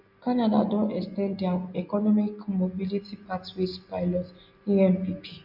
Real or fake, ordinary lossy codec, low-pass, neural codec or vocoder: real; MP3, 48 kbps; 5.4 kHz; none